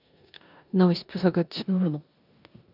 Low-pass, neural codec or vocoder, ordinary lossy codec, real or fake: 5.4 kHz; codec, 16 kHz in and 24 kHz out, 0.9 kbps, LongCat-Audio-Codec, four codebook decoder; none; fake